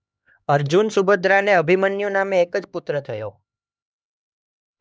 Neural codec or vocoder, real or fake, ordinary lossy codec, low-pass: codec, 16 kHz, 2 kbps, X-Codec, HuBERT features, trained on LibriSpeech; fake; none; none